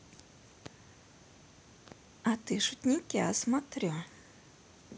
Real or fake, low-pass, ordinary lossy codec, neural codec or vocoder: real; none; none; none